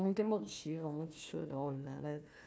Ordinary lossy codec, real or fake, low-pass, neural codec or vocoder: none; fake; none; codec, 16 kHz, 1 kbps, FunCodec, trained on Chinese and English, 50 frames a second